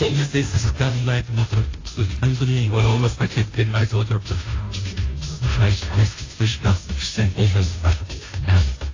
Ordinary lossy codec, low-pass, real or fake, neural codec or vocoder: AAC, 32 kbps; 7.2 kHz; fake; codec, 16 kHz, 0.5 kbps, FunCodec, trained on Chinese and English, 25 frames a second